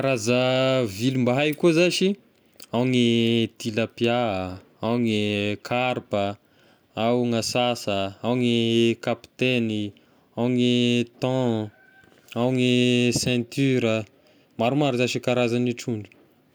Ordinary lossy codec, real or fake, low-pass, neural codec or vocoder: none; real; none; none